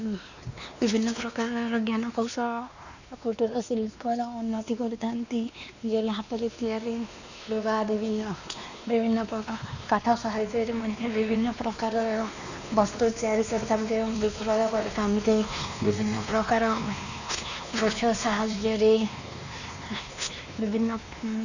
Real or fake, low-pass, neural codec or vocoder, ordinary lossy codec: fake; 7.2 kHz; codec, 16 kHz, 2 kbps, X-Codec, WavLM features, trained on Multilingual LibriSpeech; none